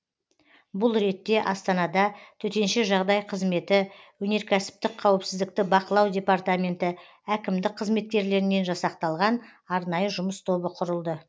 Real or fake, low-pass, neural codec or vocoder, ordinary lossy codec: real; none; none; none